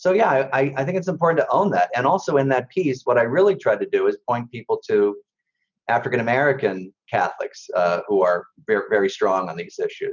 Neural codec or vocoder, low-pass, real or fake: none; 7.2 kHz; real